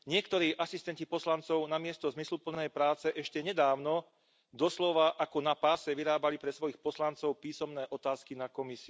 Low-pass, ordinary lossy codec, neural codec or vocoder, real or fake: none; none; none; real